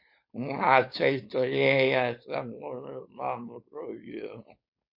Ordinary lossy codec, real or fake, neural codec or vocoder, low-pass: MP3, 32 kbps; fake; codec, 16 kHz, 4.8 kbps, FACodec; 5.4 kHz